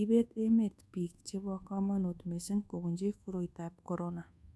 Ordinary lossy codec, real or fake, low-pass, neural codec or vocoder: none; fake; none; codec, 24 kHz, 1.2 kbps, DualCodec